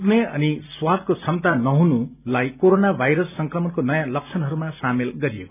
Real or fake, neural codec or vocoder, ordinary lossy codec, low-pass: real; none; none; 3.6 kHz